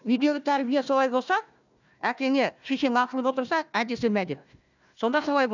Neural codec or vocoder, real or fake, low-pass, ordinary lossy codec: codec, 16 kHz, 1 kbps, FunCodec, trained on Chinese and English, 50 frames a second; fake; 7.2 kHz; none